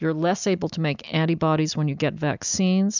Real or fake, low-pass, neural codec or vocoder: real; 7.2 kHz; none